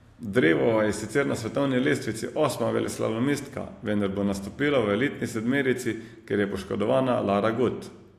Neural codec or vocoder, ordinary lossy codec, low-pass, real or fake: none; AAC, 64 kbps; 14.4 kHz; real